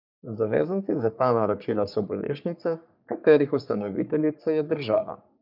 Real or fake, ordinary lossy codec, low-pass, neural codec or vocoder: fake; none; 5.4 kHz; codec, 44.1 kHz, 3.4 kbps, Pupu-Codec